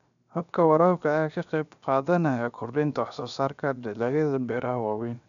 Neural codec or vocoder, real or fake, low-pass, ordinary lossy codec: codec, 16 kHz, 0.7 kbps, FocalCodec; fake; 7.2 kHz; none